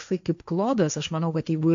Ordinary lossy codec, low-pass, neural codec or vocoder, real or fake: MP3, 64 kbps; 7.2 kHz; codec, 16 kHz, 1.1 kbps, Voila-Tokenizer; fake